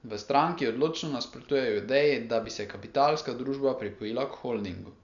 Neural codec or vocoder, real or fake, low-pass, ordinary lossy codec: none; real; 7.2 kHz; none